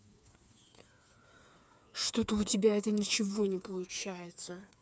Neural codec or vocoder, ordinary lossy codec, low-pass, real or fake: codec, 16 kHz, 4 kbps, FreqCodec, smaller model; none; none; fake